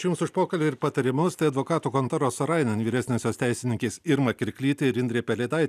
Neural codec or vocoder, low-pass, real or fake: none; 14.4 kHz; real